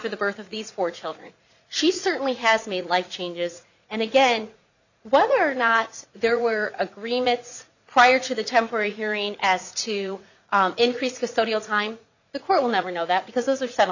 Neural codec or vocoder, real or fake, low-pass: vocoder, 44.1 kHz, 80 mel bands, Vocos; fake; 7.2 kHz